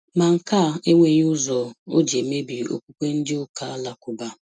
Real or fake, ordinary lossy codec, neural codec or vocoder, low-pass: real; none; none; none